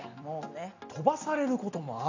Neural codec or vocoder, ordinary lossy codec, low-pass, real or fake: none; none; 7.2 kHz; real